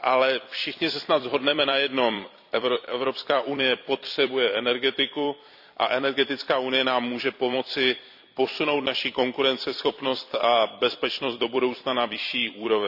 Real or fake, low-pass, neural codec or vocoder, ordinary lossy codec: fake; 5.4 kHz; vocoder, 44.1 kHz, 128 mel bands every 256 samples, BigVGAN v2; none